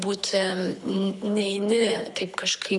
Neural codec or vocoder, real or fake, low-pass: codec, 24 kHz, 3 kbps, HILCodec; fake; 10.8 kHz